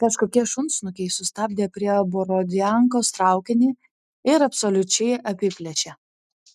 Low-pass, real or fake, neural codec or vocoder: 14.4 kHz; real; none